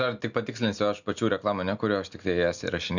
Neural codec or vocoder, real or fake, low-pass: none; real; 7.2 kHz